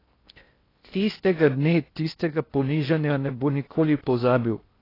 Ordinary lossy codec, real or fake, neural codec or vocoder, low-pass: AAC, 24 kbps; fake; codec, 16 kHz in and 24 kHz out, 0.6 kbps, FocalCodec, streaming, 4096 codes; 5.4 kHz